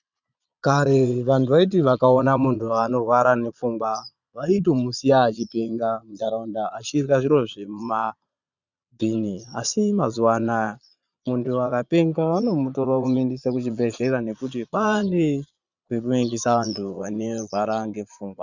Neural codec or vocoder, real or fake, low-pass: vocoder, 22.05 kHz, 80 mel bands, Vocos; fake; 7.2 kHz